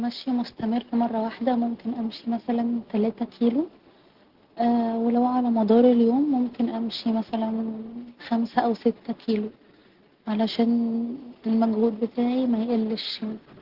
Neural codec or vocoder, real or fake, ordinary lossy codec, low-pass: none; real; Opus, 16 kbps; 5.4 kHz